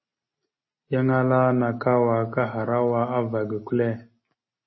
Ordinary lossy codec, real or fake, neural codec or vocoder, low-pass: MP3, 24 kbps; real; none; 7.2 kHz